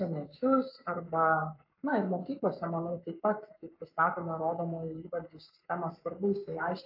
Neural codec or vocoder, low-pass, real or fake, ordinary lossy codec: codec, 44.1 kHz, 7.8 kbps, Pupu-Codec; 5.4 kHz; fake; AAC, 48 kbps